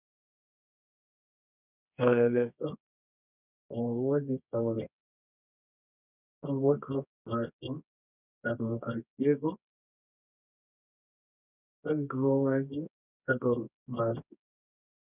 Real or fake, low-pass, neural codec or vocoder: fake; 3.6 kHz; codec, 24 kHz, 0.9 kbps, WavTokenizer, medium music audio release